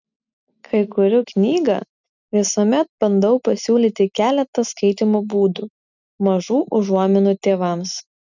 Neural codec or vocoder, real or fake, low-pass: none; real; 7.2 kHz